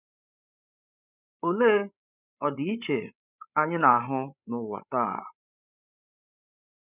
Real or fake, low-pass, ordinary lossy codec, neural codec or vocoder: fake; 3.6 kHz; none; codec, 16 kHz, 16 kbps, FreqCodec, larger model